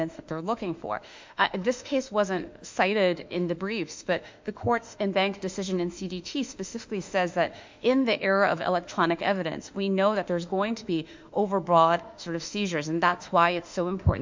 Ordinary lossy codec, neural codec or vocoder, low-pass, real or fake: MP3, 64 kbps; autoencoder, 48 kHz, 32 numbers a frame, DAC-VAE, trained on Japanese speech; 7.2 kHz; fake